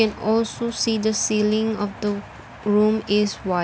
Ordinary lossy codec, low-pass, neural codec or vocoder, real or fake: none; none; none; real